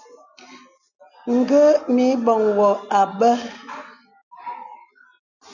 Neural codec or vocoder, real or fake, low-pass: none; real; 7.2 kHz